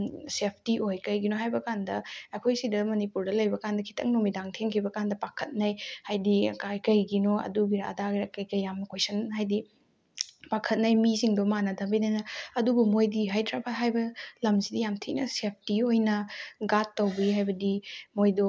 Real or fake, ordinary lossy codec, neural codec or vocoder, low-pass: real; none; none; none